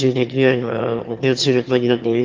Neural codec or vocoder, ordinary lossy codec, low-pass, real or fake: autoencoder, 22.05 kHz, a latent of 192 numbers a frame, VITS, trained on one speaker; Opus, 32 kbps; 7.2 kHz; fake